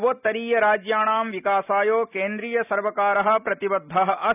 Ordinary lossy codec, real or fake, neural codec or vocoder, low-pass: none; real; none; 3.6 kHz